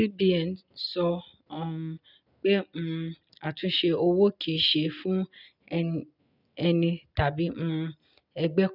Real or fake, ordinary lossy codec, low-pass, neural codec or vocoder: real; none; 5.4 kHz; none